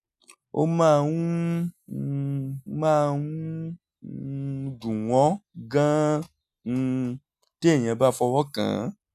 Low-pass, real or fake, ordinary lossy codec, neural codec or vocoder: 14.4 kHz; real; none; none